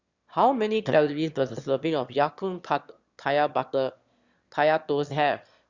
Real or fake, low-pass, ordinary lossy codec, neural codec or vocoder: fake; 7.2 kHz; Opus, 64 kbps; autoencoder, 22.05 kHz, a latent of 192 numbers a frame, VITS, trained on one speaker